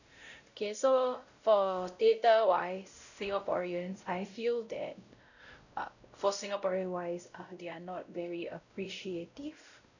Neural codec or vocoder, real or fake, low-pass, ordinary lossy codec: codec, 16 kHz, 0.5 kbps, X-Codec, WavLM features, trained on Multilingual LibriSpeech; fake; 7.2 kHz; none